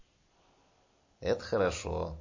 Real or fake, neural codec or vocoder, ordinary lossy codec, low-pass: real; none; MP3, 32 kbps; 7.2 kHz